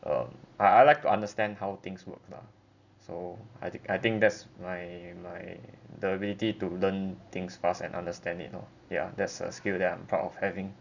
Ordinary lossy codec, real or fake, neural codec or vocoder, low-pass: none; real; none; 7.2 kHz